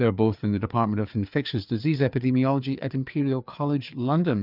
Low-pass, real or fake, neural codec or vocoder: 5.4 kHz; fake; codec, 16 kHz, 4 kbps, FreqCodec, larger model